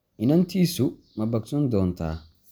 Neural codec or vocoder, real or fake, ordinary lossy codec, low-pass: none; real; none; none